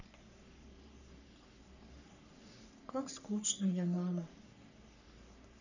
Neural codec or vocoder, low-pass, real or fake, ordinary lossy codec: codec, 44.1 kHz, 3.4 kbps, Pupu-Codec; 7.2 kHz; fake; none